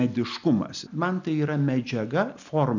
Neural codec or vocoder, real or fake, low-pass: none; real; 7.2 kHz